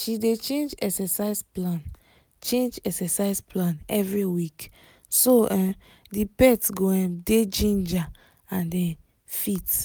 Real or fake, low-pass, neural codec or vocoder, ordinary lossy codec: real; none; none; none